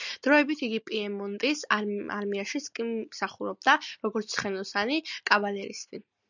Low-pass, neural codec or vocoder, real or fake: 7.2 kHz; none; real